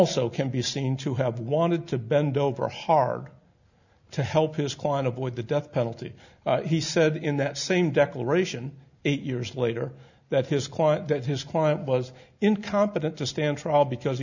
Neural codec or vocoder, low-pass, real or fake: none; 7.2 kHz; real